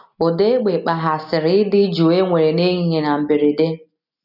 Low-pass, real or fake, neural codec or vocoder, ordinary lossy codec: 5.4 kHz; real; none; none